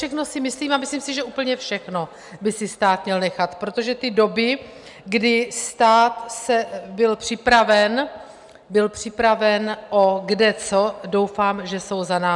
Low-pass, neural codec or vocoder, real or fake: 10.8 kHz; none; real